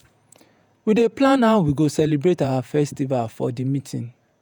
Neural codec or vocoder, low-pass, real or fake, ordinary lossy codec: vocoder, 48 kHz, 128 mel bands, Vocos; none; fake; none